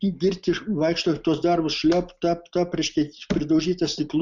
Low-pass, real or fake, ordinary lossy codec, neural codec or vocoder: 7.2 kHz; real; Opus, 64 kbps; none